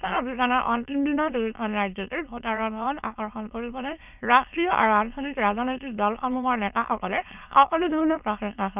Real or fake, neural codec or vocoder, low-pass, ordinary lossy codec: fake; autoencoder, 22.05 kHz, a latent of 192 numbers a frame, VITS, trained on many speakers; 3.6 kHz; none